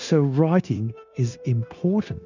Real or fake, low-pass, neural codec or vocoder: fake; 7.2 kHz; codec, 16 kHz, 0.9 kbps, LongCat-Audio-Codec